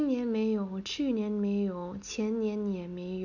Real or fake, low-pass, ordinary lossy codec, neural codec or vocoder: real; 7.2 kHz; none; none